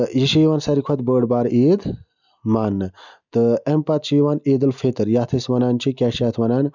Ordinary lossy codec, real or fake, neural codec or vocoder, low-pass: none; real; none; 7.2 kHz